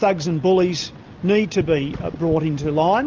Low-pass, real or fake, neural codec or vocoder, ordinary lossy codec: 7.2 kHz; real; none; Opus, 24 kbps